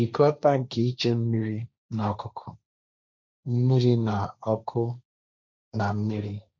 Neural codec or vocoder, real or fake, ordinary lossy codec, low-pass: codec, 16 kHz, 1.1 kbps, Voila-Tokenizer; fake; MP3, 64 kbps; 7.2 kHz